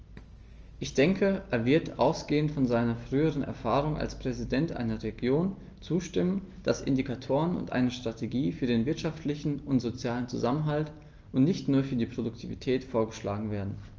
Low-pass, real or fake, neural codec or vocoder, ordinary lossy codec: 7.2 kHz; real; none; Opus, 24 kbps